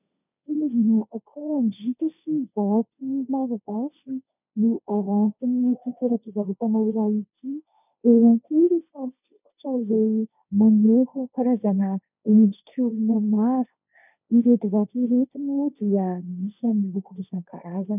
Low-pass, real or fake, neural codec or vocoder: 3.6 kHz; fake; codec, 16 kHz, 1.1 kbps, Voila-Tokenizer